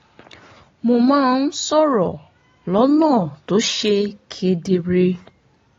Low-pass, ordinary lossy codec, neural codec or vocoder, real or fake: 7.2 kHz; AAC, 32 kbps; none; real